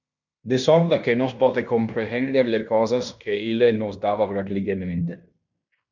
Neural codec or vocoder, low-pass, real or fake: codec, 16 kHz in and 24 kHz out, 0.9 kbps, LongCat-Audio-Codec, fine tuned four codebook decoder; 7.2 kHz; fake